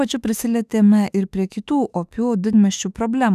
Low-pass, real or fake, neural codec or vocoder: 14.4 kHz; fake; autoencoder, 48 kHz, 32 numbers a frame, DAC-VAE, trained on Japanese speech